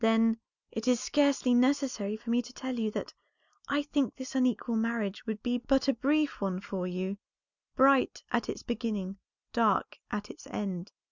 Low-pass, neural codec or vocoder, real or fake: 7.2 kHz; none; real